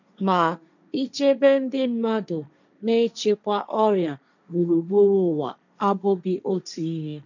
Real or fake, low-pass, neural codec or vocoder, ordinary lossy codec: fake; 7.2 kHz; codec, 16 kHz, 1.1 kbps, Voila-Tokenizer; none